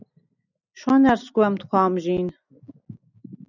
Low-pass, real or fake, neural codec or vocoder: 7.2 kHz; real; none